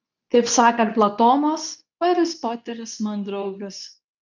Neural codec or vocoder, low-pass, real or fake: codec, 24 kHz, 0.9 kbps, WavTokenizer, medium speech release version 2; 7.2 kHz; fake